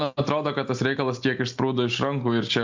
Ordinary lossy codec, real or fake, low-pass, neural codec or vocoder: MP3, 64 kbps; real; 7.2 kHz; none